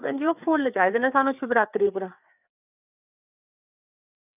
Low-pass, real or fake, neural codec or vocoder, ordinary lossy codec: 3.6 kHz; fake; codec, 16 kHz, 4 kbps, FreqCodec, larger model; none